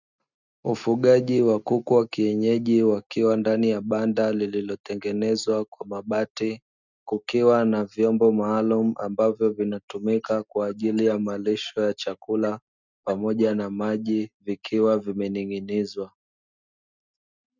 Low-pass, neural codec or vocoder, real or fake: 7.2 kHz; none; real